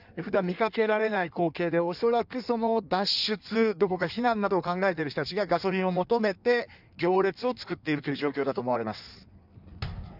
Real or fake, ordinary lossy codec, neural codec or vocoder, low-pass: fake; none; codec, 16 kHz in and 24 kHz out, 1.1 kbps, FireRedTTS-2 codec; 5.4 kHz